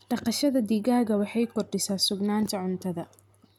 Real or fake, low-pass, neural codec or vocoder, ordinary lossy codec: real; 19.8 kHz; none; none